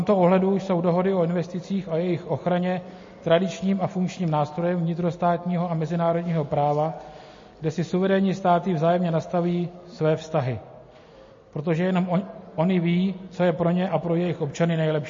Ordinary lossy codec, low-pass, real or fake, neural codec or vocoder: MP3, 32 kbps; 7.2 kHz; real; none